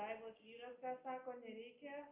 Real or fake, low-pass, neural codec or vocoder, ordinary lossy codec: real; 3.6 kHz; none; Opus, 32 kbps